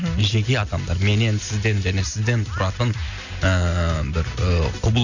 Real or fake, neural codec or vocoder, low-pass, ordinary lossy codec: real; none; 7.2 kHz; none